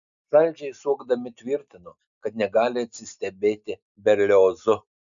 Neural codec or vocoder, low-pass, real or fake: none; 7.2 kHz; real